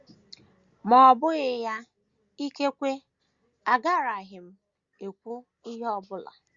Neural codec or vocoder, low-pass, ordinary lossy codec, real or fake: none; 7.2 kHz; Opus, 64 kbps; real